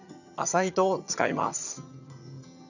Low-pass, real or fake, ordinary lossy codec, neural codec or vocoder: 7.2 kHz; fake; none; vocoder, 22.05 kHz, 80 mel bands, HiFi-GAN